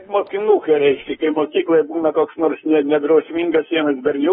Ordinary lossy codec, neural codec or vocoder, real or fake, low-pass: AAC, 16 kbps; autoencoder, 48 kHz, 32 numbers a frame, DAC-VAE, trained on Japanese speech; fake; 19.8 kHz